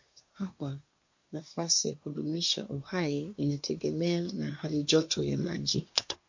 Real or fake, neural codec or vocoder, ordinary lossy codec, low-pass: fake; codec, 24 kHz, 1 kbps, SNAC; MP3, 48 kbps; 7.2 kHz